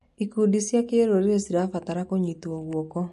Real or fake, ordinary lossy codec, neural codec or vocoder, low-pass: real; MP3, 48 kbps; none; 14.4 kHz